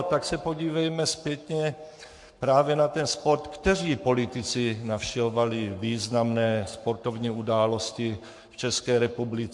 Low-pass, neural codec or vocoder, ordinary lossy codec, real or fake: 10.8 kHz; codec, 44.1 kHz, 7.8 kbps, Pupu-Codec; MP3, 64 kbps; fake